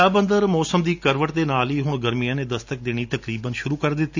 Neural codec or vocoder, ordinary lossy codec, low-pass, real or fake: none; none; 7.2 kHz; real